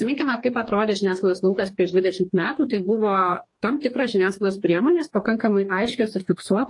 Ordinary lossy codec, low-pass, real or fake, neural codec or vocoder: AAC, 48 kbps; 10.8 kHz; fake; codec, 44.1 kHz, 2.6 kbps, DAC